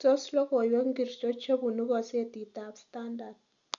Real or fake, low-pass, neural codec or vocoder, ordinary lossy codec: real; 7.2 kHz; none; none